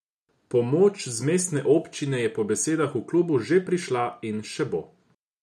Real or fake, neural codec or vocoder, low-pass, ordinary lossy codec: real; none; none; none